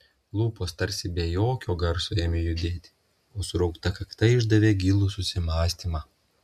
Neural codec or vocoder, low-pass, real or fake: none; 14.4 kHz; real